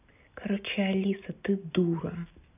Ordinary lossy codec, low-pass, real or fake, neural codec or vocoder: none; 3.6 kHz; real; none